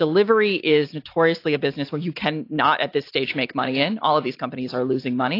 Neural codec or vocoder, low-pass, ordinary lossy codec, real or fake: none; 5.4 kHz; AAC, 32 kbps; real